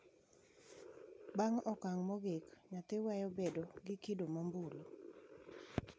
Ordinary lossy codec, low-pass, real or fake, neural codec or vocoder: none; none; real; none